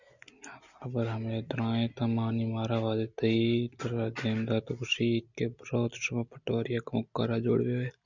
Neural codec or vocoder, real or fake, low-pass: none; real; 7.2 kHz